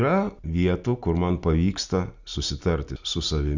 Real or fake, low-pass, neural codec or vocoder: real; 7.2 kHz; none